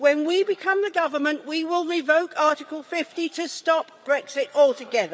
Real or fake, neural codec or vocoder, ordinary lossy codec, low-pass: fake; codec, 16 kHz, 8 kbps, FreqCodec, larger model; none; none